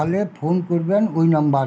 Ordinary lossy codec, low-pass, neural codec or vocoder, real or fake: none; none; none; real